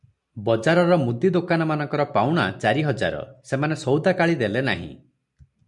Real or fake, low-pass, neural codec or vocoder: real; 10.8 kHz; none